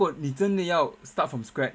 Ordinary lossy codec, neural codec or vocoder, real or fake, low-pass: none; none; real; none